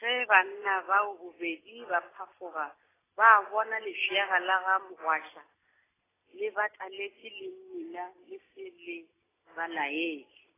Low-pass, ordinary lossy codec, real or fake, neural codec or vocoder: 3.6 kHz; AAC, 16 kbps; real; none